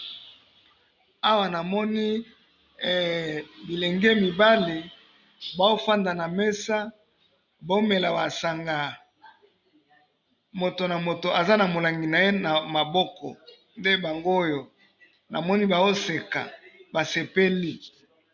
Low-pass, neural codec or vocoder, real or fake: 7.2 kHz; none; real